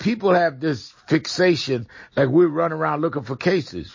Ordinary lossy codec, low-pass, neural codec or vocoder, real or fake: MP3, 32 kbps; 7.2 kHz; none; real